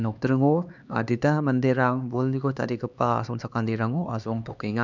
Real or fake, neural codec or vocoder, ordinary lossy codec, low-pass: fake; codec, 16 kHz, 2 kbps, X-Codec, HuBERT features, trained on LibriSpeech; none; 7.2 kHz